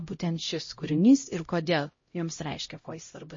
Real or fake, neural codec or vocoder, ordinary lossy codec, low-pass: fake; codec, 16 kHz, 0.5 kbps, X-Codec, HuBERT features, trained on LibriSpeech; MP3, 32 kbps; 7.2 kHz